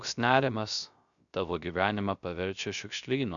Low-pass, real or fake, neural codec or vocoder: 7.2 kHz; fake; codec, 16 kHz, 0.3 kbps, FocalCodec